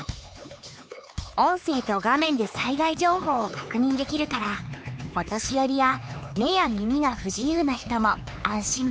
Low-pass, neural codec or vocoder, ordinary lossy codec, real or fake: none; codec, 16 kHz, 4 kbps, X-Codec, HuBERT features, trained on LibriSpeech; none; fake